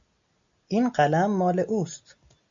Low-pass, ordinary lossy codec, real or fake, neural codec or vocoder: 7.2 kHz; AAC, 64 kbps; real; none